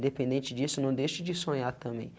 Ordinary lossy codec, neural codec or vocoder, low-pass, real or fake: none; none; none; real